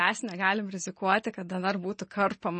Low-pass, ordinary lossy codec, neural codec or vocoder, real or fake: 10.8 kHz; MP3, 32 kbps; none; real